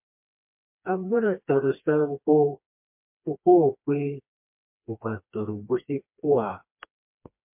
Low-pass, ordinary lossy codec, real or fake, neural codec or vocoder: 3.6 kHz; MP3, 32 kbps; fake; codec, 16 kHz, 2 kbps, FreqCodec, smaller model